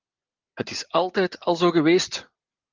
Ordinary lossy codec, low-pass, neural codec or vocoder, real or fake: Opus, 24 kbps; 7.2 kHz; none; real